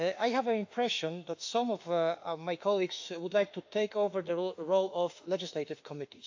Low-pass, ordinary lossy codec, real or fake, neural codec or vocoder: 7.2 kHz; AAC, 48 kbps; fake; autoencoder, 48 kHz, 32 numbers a frame, DAC-VAE, trained on Japanese speech